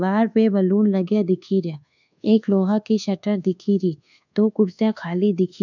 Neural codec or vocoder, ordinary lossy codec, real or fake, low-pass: codec, 24 kHz, 1.2 kbps, DualCodec; none; fake; 7.2 kHz